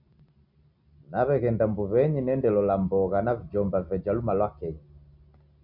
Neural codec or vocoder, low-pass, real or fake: none; 5.4 kHz; real